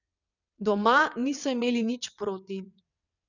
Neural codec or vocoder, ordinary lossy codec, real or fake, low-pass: vocoder, 22.05 kHz, 80 mel bands, WaveNeXt; none; fake; 7.2 kHz